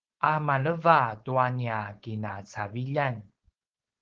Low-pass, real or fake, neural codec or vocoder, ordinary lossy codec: 7.2 kHz; fake; codec, 16 kHz, 4.8 kbps, FACodec; Opus, 16 kbps